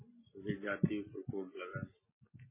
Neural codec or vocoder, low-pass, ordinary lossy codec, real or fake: none; 3.6 kHz; MP3, 16 kbps; real